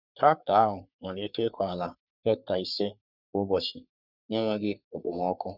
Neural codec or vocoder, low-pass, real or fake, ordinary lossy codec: codec, 44.1 kHz, 3.4 kbps, Pupu-Codec; 5.4 kHz; fake; none